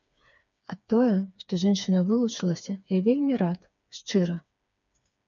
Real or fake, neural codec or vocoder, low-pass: fake; codec, 16 kHz, 4 kbps, FreqCodec, smaller model; 7.2 kHz